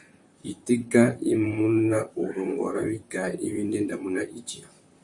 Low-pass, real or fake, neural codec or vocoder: 10.8 kHz; fake; vocoder, 44.1 kHz, 128 mel bands, Pupu-Vocoder